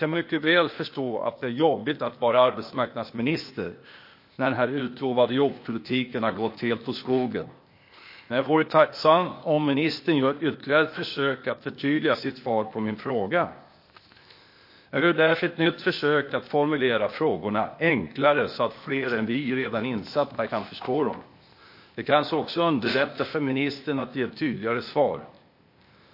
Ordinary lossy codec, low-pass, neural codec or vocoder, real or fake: MP3, 32 kbps; 5.4 kHz; codec, 16 kHz, 0.8 kbps, ZipCodec; fake